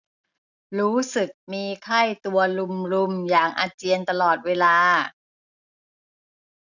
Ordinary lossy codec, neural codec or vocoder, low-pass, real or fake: none; none; 7.2 kHz; real